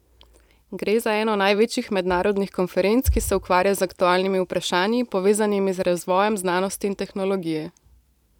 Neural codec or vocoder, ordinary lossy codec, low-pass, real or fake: vocoder, 44.1 kHz, 128 mel bands every 512 samples, BigVGAN v2; none; 19.8 kHz; fake